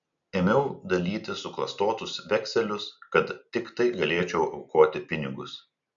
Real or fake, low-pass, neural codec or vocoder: real; 7.2 kHz; none